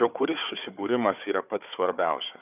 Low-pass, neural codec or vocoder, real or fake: 3.6 kHz; codec, 16 kHz, 4 kbps, FunCodec, trained on Chinese and English, 50 frames a second; fake